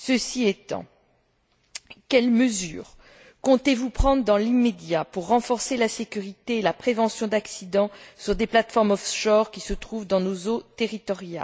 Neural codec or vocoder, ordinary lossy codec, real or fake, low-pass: none; none; real; none